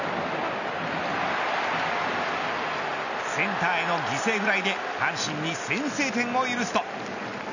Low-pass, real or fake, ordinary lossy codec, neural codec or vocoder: 7.2 kHz; real; none; none